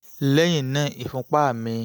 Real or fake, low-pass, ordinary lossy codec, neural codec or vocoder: real; none; none; none